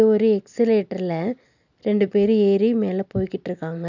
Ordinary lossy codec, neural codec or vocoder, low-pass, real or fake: none; none; 7.2 kHz; real